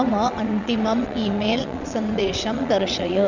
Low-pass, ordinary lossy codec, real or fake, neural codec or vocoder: 7.2 kHz; none; fake; vocoder, 22.05 kHz, 80 mel bands, WaveNeXt